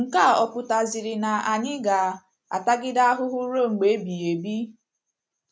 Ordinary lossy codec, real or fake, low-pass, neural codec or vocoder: none; real; none; none